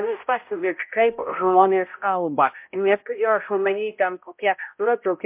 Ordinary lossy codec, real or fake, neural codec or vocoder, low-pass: MP3, 32 kbps; fake; codec, 16 kHz, 0.5 kbps, X-Codec, HuBERT features, trained on balanced general audio; 3.6 kHz